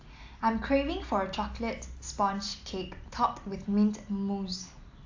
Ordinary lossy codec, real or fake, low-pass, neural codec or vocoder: AAC, 48 kbps; real; 7.2 kHz; none